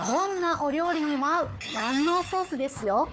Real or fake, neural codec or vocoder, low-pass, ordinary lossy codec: fake; codec, 16 kHz, 4 kbps, FunCodec, trained on Chinese and English, 50 frames a second; none; none